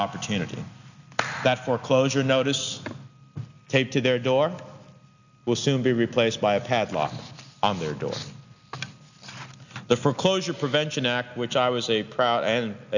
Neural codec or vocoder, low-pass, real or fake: none; 7.2 kHz; real